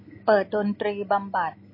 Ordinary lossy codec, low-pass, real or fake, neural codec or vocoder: MP3, 24 kbps; 5.4 kHz; real; none